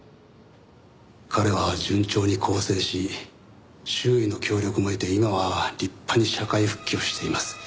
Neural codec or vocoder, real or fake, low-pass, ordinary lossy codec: none; real; none; none